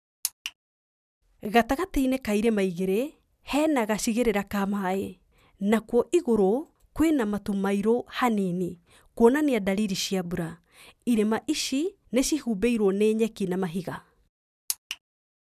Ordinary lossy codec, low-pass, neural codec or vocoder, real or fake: none; 14.4 kHz; none; real